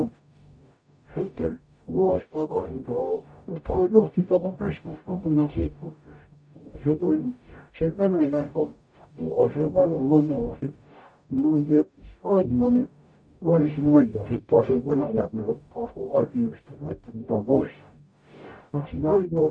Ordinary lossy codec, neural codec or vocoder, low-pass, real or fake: none; codec, 44.1 kHz, 0.9 kbps, DAC; 9.9 kHz; fake